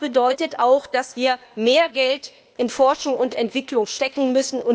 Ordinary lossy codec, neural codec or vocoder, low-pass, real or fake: none; codec, 16 kHz, 0.8 kbps, ZipCodec; none; fake